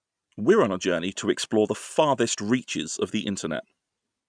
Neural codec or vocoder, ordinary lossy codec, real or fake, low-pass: none; none; real; 9.9 kHz